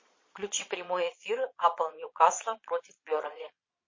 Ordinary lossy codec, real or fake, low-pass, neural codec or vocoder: MP3, 32 kbps; fake; 7.2 kHz; vocoder, 22.05 kHz, 80 mel bands, Vocos